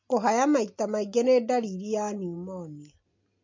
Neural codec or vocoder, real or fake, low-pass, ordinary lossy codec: none; real; 7.2 kHz; MP3, 48 kbps